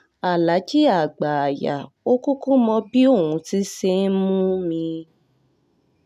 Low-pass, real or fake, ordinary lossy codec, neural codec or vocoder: 14.4 kHz; real; none; none